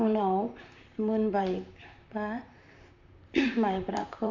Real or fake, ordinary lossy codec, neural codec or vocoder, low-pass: fake; none; vocoder, 44.1 kHz, 128 mel bands, Pupu-Vocoder; 7.2 kHz